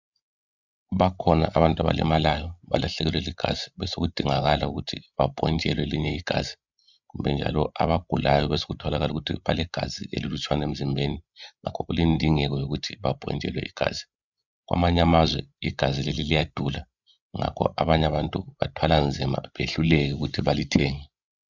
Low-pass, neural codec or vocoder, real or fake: 7.2 kHz; codec, 16 kHz, 16 kbps, FreqCodec, larger model; fake